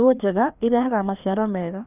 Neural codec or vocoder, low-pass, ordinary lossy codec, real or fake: codec, 16 kHz, 2 kbps, FreqCodec, larger model; 3.6 kHz; none; fake